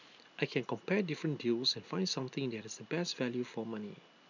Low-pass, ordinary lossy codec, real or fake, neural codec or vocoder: 7.2 kHz; none; real; none